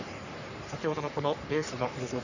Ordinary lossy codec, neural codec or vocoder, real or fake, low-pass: none; codec, 44.1 kHz, 3.4 kbps, Pupu-Codec; fake; 7.2 kHz